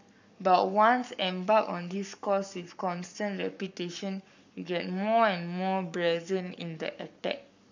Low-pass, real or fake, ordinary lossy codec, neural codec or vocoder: 7.2 kHz; fake; none; codec, 44.1 kHz, 7.8 kbps, Pupu-Codec